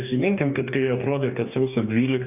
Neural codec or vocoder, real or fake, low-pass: codec, 44.1 kHz, 2.6 kbps, DAC; fake; 3.6 kHz